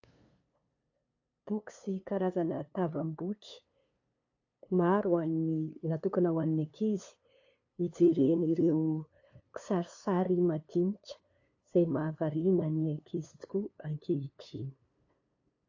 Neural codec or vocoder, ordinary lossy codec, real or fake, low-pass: codec, 16 kHz, 2 kbps, FunCodec, trained on LibriTTS, 25 frames a second; AAC, 48 kbps; fake; 7.2 kHz